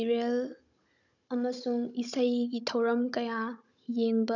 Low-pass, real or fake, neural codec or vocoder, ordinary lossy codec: 7.2 kHz; fake; codec, 16 kHz, 16 kbps, FunCodec, trained on Chinese and English, 50 frames a second; none